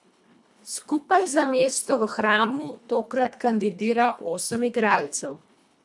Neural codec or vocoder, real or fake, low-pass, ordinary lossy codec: codec, 24 kHz, 1.5 kbps, HILCodec; fake; none; none